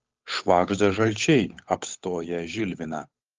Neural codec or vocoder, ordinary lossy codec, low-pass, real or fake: codec, 16 kHz, 8 kbps, FunCodec, trained on Chinese and English, 25 frames a second; Opus, 32 kbps; 7.2 kHz; fake